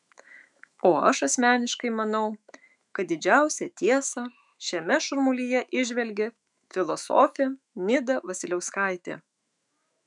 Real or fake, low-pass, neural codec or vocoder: fake; 10.8 kHz; autoencoder, 48 kHz, 128 numbers a frame, DAC-VAE, trained on Japanese speech